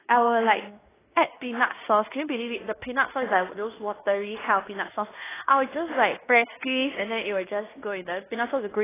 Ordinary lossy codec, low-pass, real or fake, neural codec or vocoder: AAC, 16 kbps; 3.6 kHz; fake; codec, 16 kHz in and 24 kHz out, 0.9 kbps, LongCat-Audio-Codec, fine tuned four codebook decoder